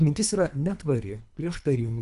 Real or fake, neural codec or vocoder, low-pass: fake; codec, 24 kHz, 3 kbps, HILCodec; 10.8 kHz